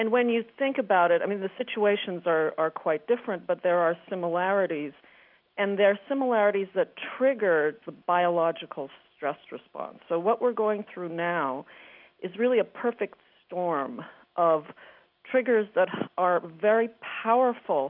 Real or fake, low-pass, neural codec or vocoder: real; 5.4 kHz; none